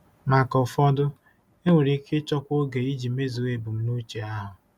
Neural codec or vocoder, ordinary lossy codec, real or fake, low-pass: none; none; real; 19.8 kHz